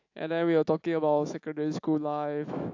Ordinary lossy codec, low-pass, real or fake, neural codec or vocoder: AAC, 48 kbps; 7.2 kHz; fake; autoencoder, 48 kHz, 128 numbers a frame, DAC-VAE, trained on Japanese speech